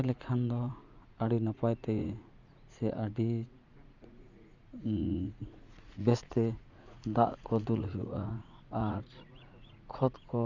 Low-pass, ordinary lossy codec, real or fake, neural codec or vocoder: 7.2 kHz; none; fake; vocoder, 44.1 kHz, 80 mel bands, Vocos